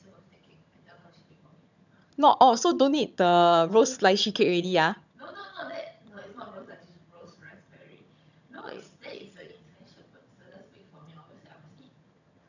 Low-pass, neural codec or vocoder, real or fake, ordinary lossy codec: 7.2 kHz; vocoder, 22.05 kHz, 80 mel bands, HiFi-GAN; fake; none